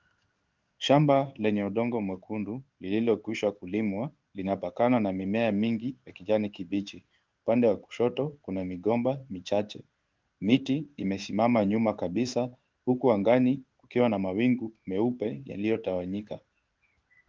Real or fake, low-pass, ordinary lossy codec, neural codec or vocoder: fake; 7.2 kHz; Opus, 32 kbps; codec, 16 kHz in and 24 kHz out, 1 kbps, XY-Tokenizer